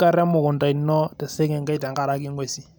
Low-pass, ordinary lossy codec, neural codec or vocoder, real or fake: none; none; none; real